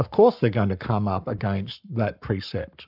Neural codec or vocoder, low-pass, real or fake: codec, 44.1 kHz, 7.8 kbps, Pupu-Codec; 5.4 kHz; fake